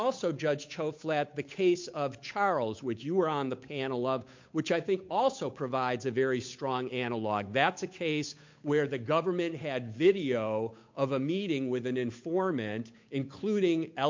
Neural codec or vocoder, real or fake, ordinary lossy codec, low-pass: codec, 16 kHz, 8 kbps, FunCodec, trained on Chinese and English, 25 frames a second; fake; MP3, 48 kbps; 7.2 kHz